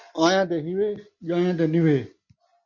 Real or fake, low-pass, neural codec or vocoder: real; 7.2 kHz; none